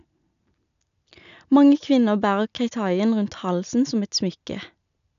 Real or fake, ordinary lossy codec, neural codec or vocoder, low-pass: real; none; none; 7.2 kHz